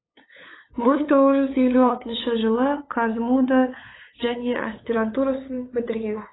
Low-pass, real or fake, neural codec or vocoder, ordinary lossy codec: 7.2 kHz; fake; codec, 16 kHz, 8 kbps, FunCodec, trained on LibriTTS, 25 frames a second; AAC, 16 kbps